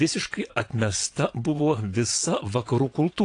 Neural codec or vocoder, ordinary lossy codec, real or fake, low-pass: vocoder, 22.05 kHz, 80 mel bands, WaveNeXt; AAC, 48 kbps; fake; 9.9 kHz